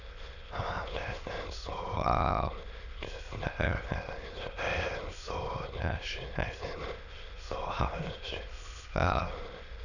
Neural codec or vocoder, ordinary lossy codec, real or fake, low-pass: autoencoder, 22.05 kHz, a latent of 192 numbers a frame, VITS, trained on many speakers; none; fake; 7.2 kHz